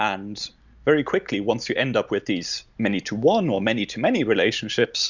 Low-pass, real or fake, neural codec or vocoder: 7.2 kHz; real; none